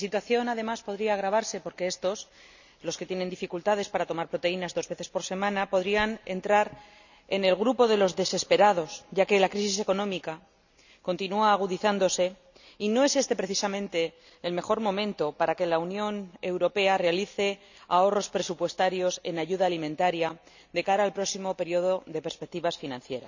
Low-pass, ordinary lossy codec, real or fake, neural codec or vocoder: 7.2 kHz; none; real; none